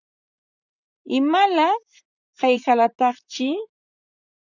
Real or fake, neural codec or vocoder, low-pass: fake; vocoder, 44.1 kHz, 128 mel bands, Pupu-Vocoder; 7.2 kHz